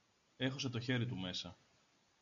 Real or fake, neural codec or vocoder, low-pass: real; none; 7.2 kHz